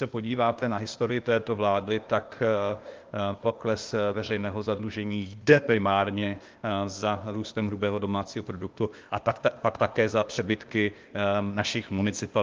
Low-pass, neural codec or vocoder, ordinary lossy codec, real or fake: 7.2 kHz; codec, 16 kHz, 0.8 kbps, ZipCodec; Opus, 32 kbps; fake